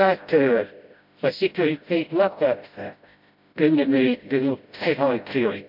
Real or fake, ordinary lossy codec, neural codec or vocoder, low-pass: fake; MP3, 48 kbps; codec, 16 kHz, 0.5 kbps, FreqCodec, smaller model; 5.4 kHz